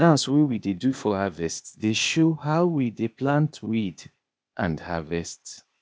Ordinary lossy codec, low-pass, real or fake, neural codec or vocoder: none; none; fake; codec, 16 kHz, 0.8 kbps, ZipCodec